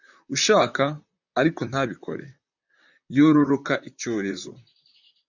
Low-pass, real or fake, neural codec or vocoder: 7.2 kHz; fake; vocoder, 44.1 kHz, 128 mel bands, Pupu-Vocoder